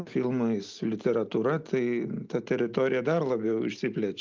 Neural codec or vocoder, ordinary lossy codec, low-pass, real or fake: none; Opus, 24 kbps; 7.2 kHz; real